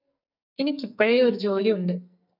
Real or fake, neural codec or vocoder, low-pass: fake; codec, 44.1 kHz, 2.6 kbps, SNAC; 5.4 kHz